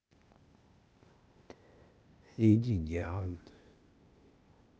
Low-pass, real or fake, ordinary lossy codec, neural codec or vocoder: none; fake; none; codec, 16 kHz, 0.8 kbps, ZipCodec